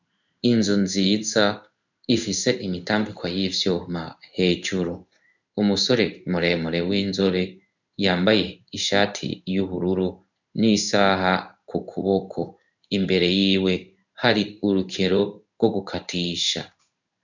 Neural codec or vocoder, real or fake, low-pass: codec, 16 kHz in and 24 kHz out, 1 kbps, XY-Tokenizer; fake; 7.2 kHz